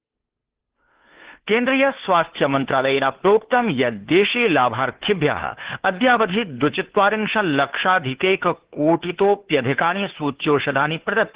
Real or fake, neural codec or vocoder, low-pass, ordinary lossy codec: fake; codec, 16 kHz, 2 kbps, FunCodec, trained on Chinese and English, 25 frames a second; 3.6 kHz; Opus, 16 kbps